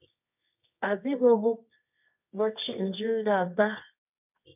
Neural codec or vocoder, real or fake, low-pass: codec, 24 kHz, 0.9 kbps, WavTokenizer, medium music audio release; fake; 3.6 kHz